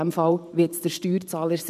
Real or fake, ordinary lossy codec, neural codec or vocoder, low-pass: fake; none; vocoder, 44.1 kHz, 128 mel bands every 512 samples, BigVGAN v2; 14.4 kHz